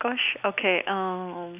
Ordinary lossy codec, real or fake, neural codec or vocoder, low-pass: none; real; none; 3.6 kHz